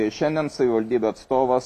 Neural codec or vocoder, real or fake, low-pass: none; real; 14.4 kHz